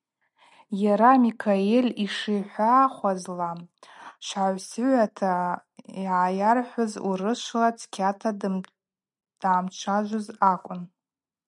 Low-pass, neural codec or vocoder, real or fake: 10.8 kHz; none; real